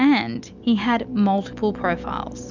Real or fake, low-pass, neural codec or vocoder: real; 7.2 kHz; none